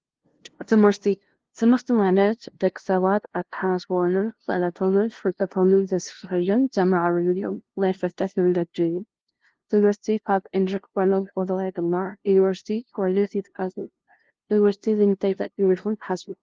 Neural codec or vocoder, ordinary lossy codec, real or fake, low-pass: codec, 16 kHz, 0.5 kbps, FunCodec, trained on LibriTTS, 25 frames a second; Opus, 16 kbps; fake; 7.2 kHz